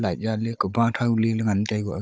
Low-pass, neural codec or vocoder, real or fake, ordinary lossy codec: none; codec, 16 kHz, 16 kbps, FunCodec, trained on Chinese and English, 50 frames a second; fake; none